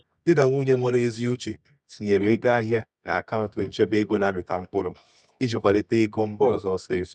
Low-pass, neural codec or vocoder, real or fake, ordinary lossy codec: none; codec, 24 kHz, 0.9 kbps, WavTokenizer, medium music audio release; fake; none